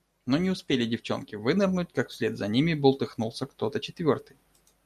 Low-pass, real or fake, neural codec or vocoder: 14.4 kHz; real; none